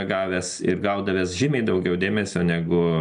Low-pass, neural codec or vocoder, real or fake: 9.9 kHz; none; real